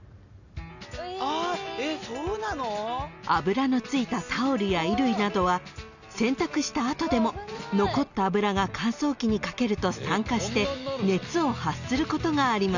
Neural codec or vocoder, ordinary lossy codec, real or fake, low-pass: none; none; real; 7.2 kHz